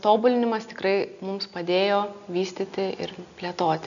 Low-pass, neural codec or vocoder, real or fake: 7.2 kHz; none; real